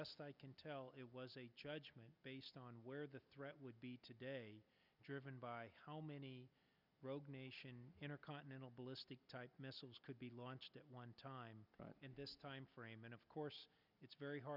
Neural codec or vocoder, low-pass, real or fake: none; 5.4 kHz; real